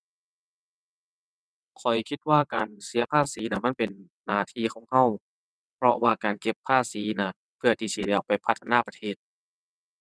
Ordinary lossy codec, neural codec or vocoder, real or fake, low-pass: none; vocoder, 22.05 kHz, 80 mel bands, WaveNeXt; fake; none